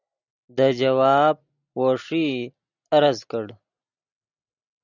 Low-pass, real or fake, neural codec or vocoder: 7.2 kHz; real; none